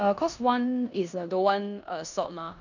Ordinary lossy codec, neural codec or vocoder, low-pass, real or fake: none; codec, 16 kHz in and 24 kHz out, 0.9 kbps, LongCat-Audio-Codec, four codebook decoder; 7.2 kHz; fake